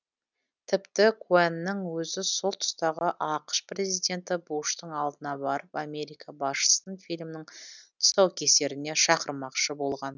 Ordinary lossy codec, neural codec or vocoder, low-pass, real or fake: none; none; 7.2 kHz; real